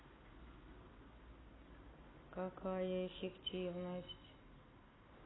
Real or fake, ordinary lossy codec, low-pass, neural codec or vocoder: real; AAC, 16 kbps; 7.2 kHz; none